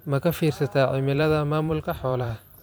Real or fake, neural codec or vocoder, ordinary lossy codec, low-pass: fake; vocoder, 44.1 kHz, 128 mel bands every 512 samples, BigVGAN v2; none; none